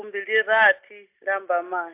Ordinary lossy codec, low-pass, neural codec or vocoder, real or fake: AAC, 24 kbps; 3.6 kHz; none; real